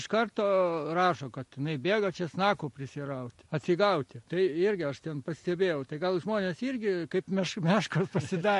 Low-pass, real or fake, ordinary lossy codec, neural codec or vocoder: 14.4 kHz; real; MP3, 48 kbps; none